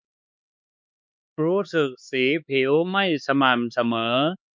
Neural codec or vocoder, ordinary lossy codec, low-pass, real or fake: codec, 16 kHz, 4 kbps, X-Codec, HuBERT features, trained on LibriSpeech; none; none; fake